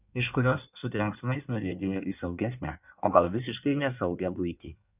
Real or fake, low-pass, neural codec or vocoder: fake; 3.6 kHz; codec, 16 kHz in and 24 kHz out, 1.1 kbps, FireRedTTS-2 codec